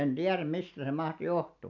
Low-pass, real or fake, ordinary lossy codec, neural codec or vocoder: 7.2 kHz; real; none; none